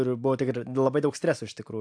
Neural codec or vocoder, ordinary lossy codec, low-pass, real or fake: none; AAC, 64 kbps; 9.9 kHz; real